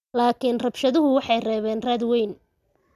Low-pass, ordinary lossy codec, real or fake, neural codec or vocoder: 14.4 kHz; none; real; none